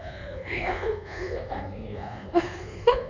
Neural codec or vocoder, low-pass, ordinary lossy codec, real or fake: codec, 24 kHz, 1.2 kbps, DualCodec; 7.2 kHz; none; fake